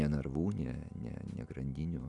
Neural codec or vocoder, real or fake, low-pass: none; real; 10.8 kHz